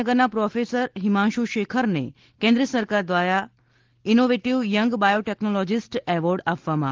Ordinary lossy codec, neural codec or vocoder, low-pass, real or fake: Opus, 16 kbps; none; 7.2 kHz; real